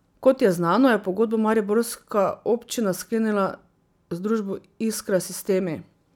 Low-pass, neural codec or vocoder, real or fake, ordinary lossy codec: 19.8 kHz; none; real; none